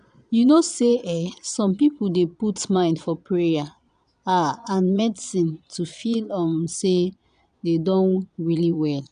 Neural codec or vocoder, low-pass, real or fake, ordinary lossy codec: vocoder, 22.05 kHz, 80 mel bands, Vocos; 9.9 kHz; fake; none